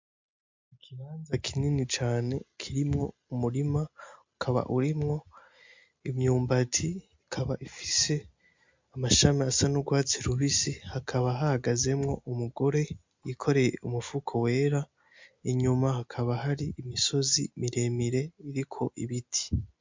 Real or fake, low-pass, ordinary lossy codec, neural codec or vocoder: real; 7.2 kHz; MP3, 64 kbps; none